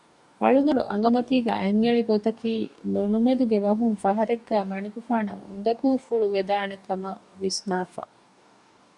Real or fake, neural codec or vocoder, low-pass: fake; codec, 44.1 kHz, 2.6 kbps, DAC; 10.8 kHz